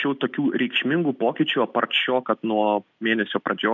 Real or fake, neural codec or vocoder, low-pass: real; none; 7.2 kHz